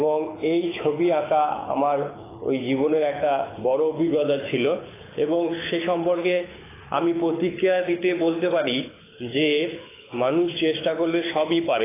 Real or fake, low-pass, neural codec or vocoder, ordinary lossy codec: fake; 3.6 kHz; codec, 24 kHz, 6 kbps, HILCodec; AAC, 16 kbps